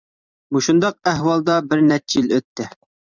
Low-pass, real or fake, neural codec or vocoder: 7.2 kHz; fake; vocoder, 44.1 kHz, 128 mel bands every 256 samples, BigVGAN v2